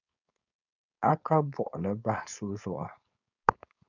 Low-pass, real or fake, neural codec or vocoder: 7.2 kHz; fake; codec, 16 kHz, 4.8 kbps, FACodec